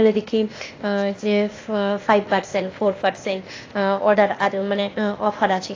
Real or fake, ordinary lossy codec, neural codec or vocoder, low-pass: fake; AAC, 32 kbps; codec, 16 kHz, 0.8 kbps, ZipCodec; 7.2 kHz